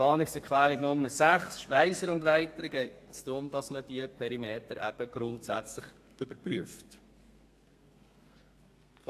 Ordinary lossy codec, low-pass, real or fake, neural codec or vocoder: AAC, 64 kbps; 14.4 kHz; fake; codec, 32 kHz, 1.9 kbps, SNAC